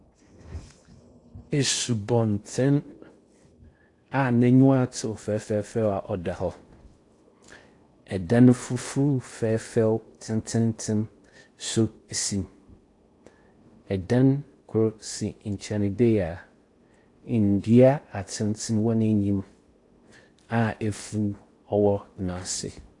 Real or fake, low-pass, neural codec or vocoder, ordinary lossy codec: fake; 10.8 kHz; codec, 16 kHz in and 24 kHz out, 0.8 kbps, FocalCodec, streaming, 65536 codes; AAC, 48 kbps